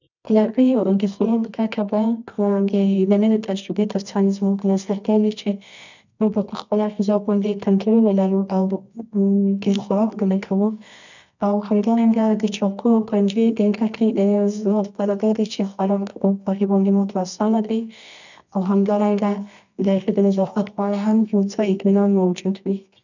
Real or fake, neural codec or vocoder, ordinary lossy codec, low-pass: fake; codec, 24 kHz, 0.9 kbps, WavTokenizer, medium music audio release; none; 7.2 kHz